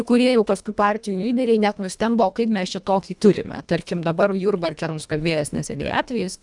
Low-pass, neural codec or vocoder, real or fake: 10.8 kHz; codec, 24 kHz, 1.5 kbps, HILCodec; fake